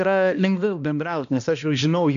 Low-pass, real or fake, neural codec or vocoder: 7.2 kHz; fake; codec, 16 kHz, 1 kbps, X-Codec, HuBERT features, trained on balanced general audio